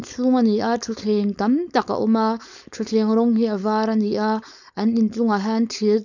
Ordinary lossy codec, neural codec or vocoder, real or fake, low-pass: none; codec, 16 kHz, 4.8 kbps, FACodec; fake; 7.2 kHz